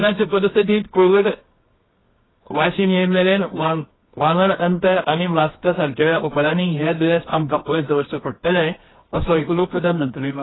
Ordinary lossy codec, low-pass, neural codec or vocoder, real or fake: AAC, 16 kbps; 7.2 kHz; codec, 24 kHz, 0.9 kbps, WavTokenizer, medium music audio release; fake